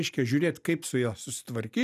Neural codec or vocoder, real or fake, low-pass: none; real; 14.4 kHz